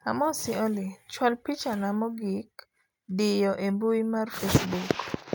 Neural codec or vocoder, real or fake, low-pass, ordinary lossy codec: none; real; none; none